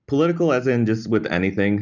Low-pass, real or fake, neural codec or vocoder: 7.2 kHz; real; none